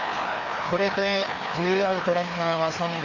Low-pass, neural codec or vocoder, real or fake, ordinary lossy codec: 7.2 kHz; codec, 16 kHz, 2 kbps, FreqCodec, larger model; fake; none